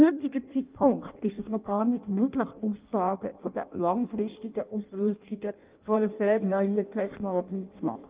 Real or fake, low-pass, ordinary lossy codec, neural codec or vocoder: fake; 3.6 kHz; Opus, 32 kbps; codec, 16 kHz in and 24 kHz out, 0.6 kbps, FireRedTTS-2 codec